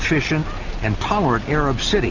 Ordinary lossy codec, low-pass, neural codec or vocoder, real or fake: Opus, 64 kbps; 7.2 kHz; none; real